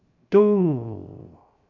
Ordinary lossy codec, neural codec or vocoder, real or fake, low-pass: none; codec, 16 kHz, 0.3 kbps, FocalCodec; fake; 7.2 kHz